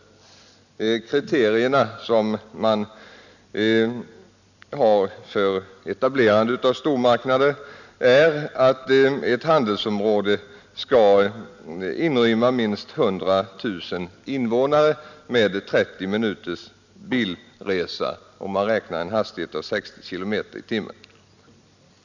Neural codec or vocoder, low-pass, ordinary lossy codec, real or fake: none; 7.2 kHz; none; real